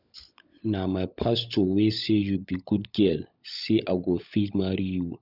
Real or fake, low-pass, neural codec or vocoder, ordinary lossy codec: fake; 5.4 kHz; codec, 16 kHz, 16 kbps, FunCodec, trained on LibriTTS, 50 frames a second; none